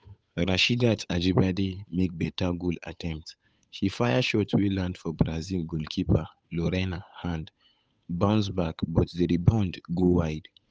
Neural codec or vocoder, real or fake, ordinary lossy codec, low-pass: codec, 16 kHz, 8 kbps, FunCodec, trained on Chinese and English, 25 frames a second; fake; none; none